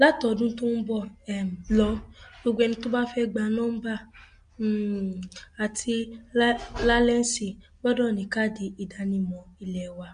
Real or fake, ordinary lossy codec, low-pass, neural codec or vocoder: real; MP3, 64 kbps; 9.9 kHz; none